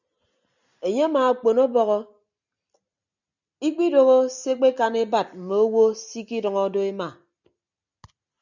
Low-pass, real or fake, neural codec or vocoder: 7.2 kHz; real; none